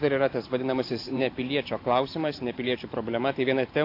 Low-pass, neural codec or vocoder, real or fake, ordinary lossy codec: 5.4 kHz; vocoder, 44.1 kHz, 128 mel bands every 512 samples, BigVGAN v2; fake; AAC, 32 kbps